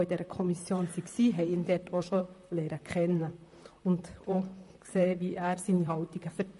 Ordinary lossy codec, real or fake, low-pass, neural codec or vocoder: MP3, 48 kbps; fake; 14.4 kHz; vocoder, 44.1 kHz, 128 mel bands, Pupu-Vocoder